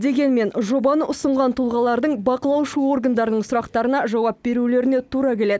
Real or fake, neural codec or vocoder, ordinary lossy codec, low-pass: real; none; none; none